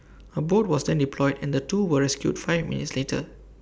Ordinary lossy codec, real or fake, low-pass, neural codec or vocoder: none; real; none; none